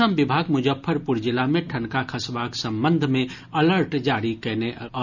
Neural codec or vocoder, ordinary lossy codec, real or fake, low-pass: none; none; real; 7.2 kHz